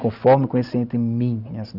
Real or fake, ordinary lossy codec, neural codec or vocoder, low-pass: real; none; none; 5.4 kHz